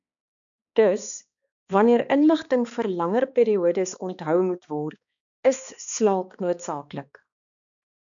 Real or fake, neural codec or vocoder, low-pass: fake; codec, 16 kHz, 2 kbps, X-Codec, HuBERT features, trained on balanced general audio; 7.2 kHz